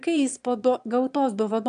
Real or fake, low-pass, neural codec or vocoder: fake; 9.9 kHz; autoencoder, 22.05 kHz, a latent of 192 numbers a frame, VITS, trained on one speaker